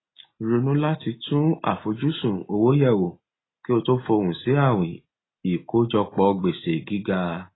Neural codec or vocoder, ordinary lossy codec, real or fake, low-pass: none; AAC, 16 kbps; real; 7.2 kHz